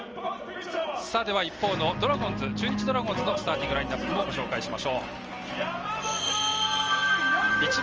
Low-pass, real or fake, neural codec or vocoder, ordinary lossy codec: 7.2 kHz; real; none; Opus, 24 kbps